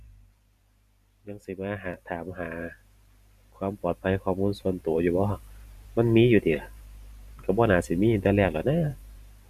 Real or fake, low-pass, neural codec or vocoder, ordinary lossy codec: fake; 14.4 kHz; vocoder, 44.1 kHz, 128 mel bands every 512 samples, BigVGAN v2; none